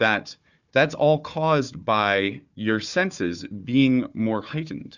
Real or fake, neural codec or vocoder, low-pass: fake; codec, 16 kHz, 4 kbps, FunCodec, trained on Chinese and English, 50 frames a second; 7.2 kHz